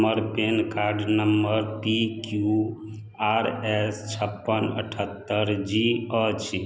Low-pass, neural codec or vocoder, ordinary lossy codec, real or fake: none; none; none; real